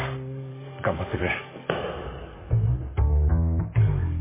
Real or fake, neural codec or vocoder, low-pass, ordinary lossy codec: fake; codec, 44.1 kHz, 7.8 kbps, DAC; 3.6 kHz; MP3, 16 kbps